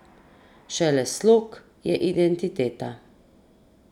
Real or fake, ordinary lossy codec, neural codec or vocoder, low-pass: real; none; none; 19.8 kHz